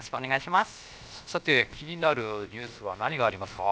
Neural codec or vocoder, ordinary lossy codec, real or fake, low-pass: codec, 16 kHz, about 1 kbps, DyCAST, with the encoder's durations; none; fake; none